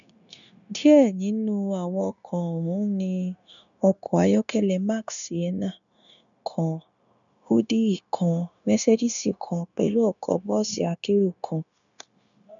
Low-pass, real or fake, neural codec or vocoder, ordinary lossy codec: 7.2 kHz; fake; codec, 16 kHz, 0.9 kbps, LongCat-Audio-Codec; none